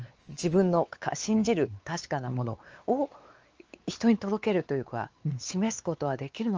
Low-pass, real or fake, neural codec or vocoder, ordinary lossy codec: 7.2 kHz; fake; codec, 16 kHz, 4 kbps, X-Codec, WavLM features, trained on Multilingual LibriSpeech; Opus, 24 kbps